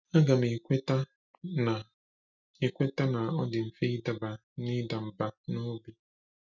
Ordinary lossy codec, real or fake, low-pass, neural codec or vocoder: none; real; 7.2 kHz; none